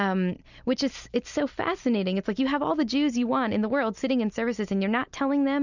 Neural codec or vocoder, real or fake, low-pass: none; real; 7.2 kHz